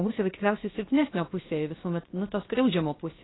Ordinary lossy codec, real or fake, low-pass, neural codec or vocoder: AAC, 16 kbps; fake; 7.2 kHz; codec, 16 kHz, 0.7 kbps, FocalCodec